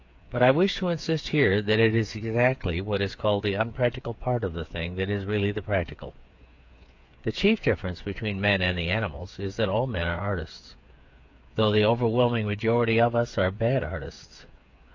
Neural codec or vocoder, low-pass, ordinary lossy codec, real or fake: codec, 16 kHz, 8 kbps, FreqCodec, smaller model; 7.2 kHz; AAC, 48 kbps; fake